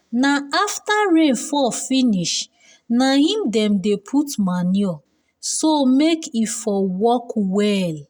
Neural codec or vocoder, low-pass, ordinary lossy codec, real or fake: none; none; none; real